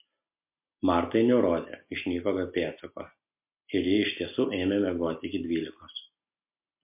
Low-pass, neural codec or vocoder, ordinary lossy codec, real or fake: 3.6 kHz; none; MP3, 32 kbps; real